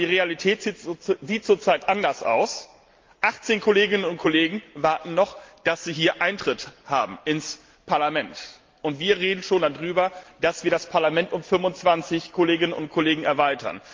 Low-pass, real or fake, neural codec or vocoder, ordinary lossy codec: 7.2 kHz; real; none; Opus, 32 kbps